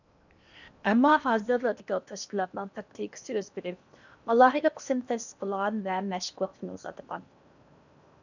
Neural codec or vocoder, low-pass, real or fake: codec, 16 kHz in and 24 kHz out, 0.8 kbps, FocalCodec, streaming, 65536 codes; 7.2 kHz; fake